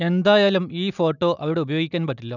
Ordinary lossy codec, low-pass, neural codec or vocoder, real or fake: none; 7.2 kHz; none; real